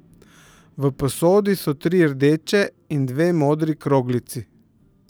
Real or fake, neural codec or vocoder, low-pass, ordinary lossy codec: fake; vocoder, 44.1 kHz, 128 mel bands every 512 samples, BigVGAN v2; none; none